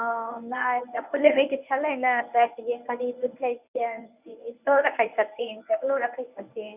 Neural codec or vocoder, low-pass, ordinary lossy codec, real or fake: codec, 24 kHz, 0.9 kbps, WavTokenizer, medium speech release version 1; 3.6 kHz; none; fake